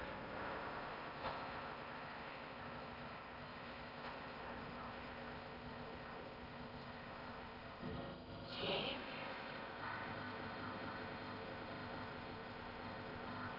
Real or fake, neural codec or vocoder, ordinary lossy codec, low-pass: fake; codec, 16 kHz in and 24 kHz out, 0.6 kbps, FocalCodec, streaming, 4096 codes; none; 5.4 kHz